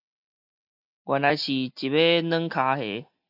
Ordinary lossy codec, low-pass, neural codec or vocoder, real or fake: AAC, 48 kbps; 5.4 kHz; none; real